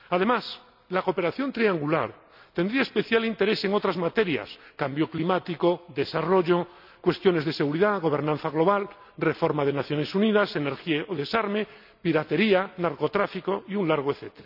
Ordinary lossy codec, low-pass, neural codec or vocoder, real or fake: none; 5.4 kHz; none; real